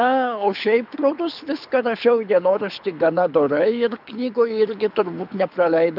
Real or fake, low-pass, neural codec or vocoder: fake; 5.4 kHz; codec, 24 kHz, 6 kbps, HILCodec